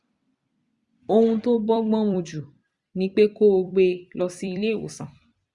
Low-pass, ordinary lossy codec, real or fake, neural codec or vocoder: 10.8 kHz; Opus, 64 kbps; fake; vocoder, 44.1 kHz, 128 mel bands every 256 samples, BigVGAN v2